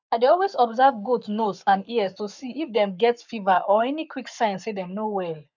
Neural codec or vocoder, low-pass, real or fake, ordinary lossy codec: codec, 44.1 kHz, 7.8 kbps, Pupu-Codec; 7.2 kHz; fake; none